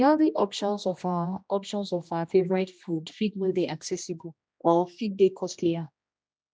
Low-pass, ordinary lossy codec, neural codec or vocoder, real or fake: none; none; codec, 16 kHz, 1 kbps, X-Codec, HuBERT features, trained on general audio; fake